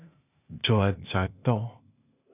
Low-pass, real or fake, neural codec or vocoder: 3.6 kHz; fake; codec, 16 kHz, 0.8 kbps, ZipCodec